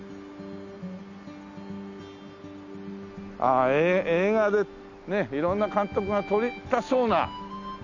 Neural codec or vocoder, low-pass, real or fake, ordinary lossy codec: none; 7.2 kHz; real; none